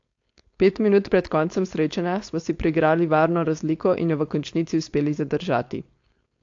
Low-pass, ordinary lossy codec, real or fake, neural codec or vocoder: 7.2 kHz; AAC, 48 kbps; fake; codec, 16 kHz, 4.8 kbps, FACodec